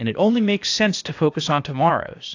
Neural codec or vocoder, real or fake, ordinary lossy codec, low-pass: codec, 16 kHz, 0.8 kbps, ZipCodec; fake; AAC, 48 kbps; 7.2 kHz